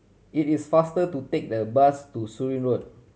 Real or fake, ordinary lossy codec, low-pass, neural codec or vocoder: real; none; none; none